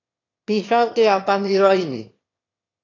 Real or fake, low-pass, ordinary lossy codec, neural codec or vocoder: fake; 7.2 kHz; AAC, 32 kbps; autoencoder, 22.05 kHz, a latent of 192 numbers a frame, VITS, trained on one speaker